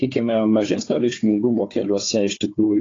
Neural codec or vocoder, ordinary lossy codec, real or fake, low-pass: codec, 16 kHz, 4 kbps, FunCodec, trained on Chinese and English, 50 frames a second; AAC, 32 kbps; fake; 7.2 kHz